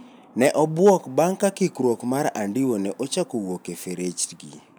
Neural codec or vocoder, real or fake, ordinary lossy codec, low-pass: none; real; none; none